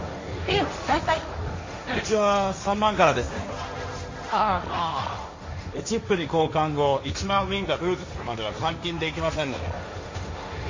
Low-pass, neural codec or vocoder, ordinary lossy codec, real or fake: 7.2 kHz; codec, 16 kHz, 1.1 kbps, Voila-Tokenizer; MP3, 32 kbps; fake